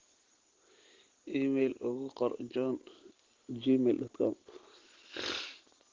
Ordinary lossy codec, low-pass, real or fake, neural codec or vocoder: Opus, 16 kbps; 7.2 kHz; real; none